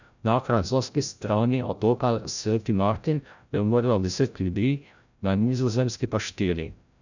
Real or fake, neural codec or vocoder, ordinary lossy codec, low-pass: fake; codec, 16 kHz, 0.5 kbps, FreqCodec, larger model; none; 7.2 kHz